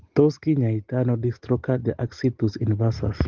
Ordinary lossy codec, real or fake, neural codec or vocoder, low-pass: Opus, 16 kbps; real; none; 7.2 kHz